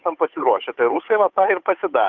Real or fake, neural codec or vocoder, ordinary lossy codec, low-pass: fake; codec, 16 kHz, 8 kbps, FunCodec, trained on Chinese and English, 25 frames a second; Opus, 24 kbps; 7.2 kHz